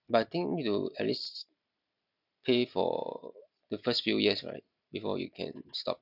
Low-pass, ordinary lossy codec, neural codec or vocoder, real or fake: 5.4 kHz; none; none; real